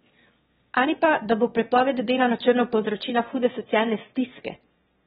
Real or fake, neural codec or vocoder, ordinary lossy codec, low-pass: fake; autoencoder, 22.05 kHz, a latent of 192 numbers a frame, VITS, trained on one speaker; AAC, 16 kbps; 9.9 kHz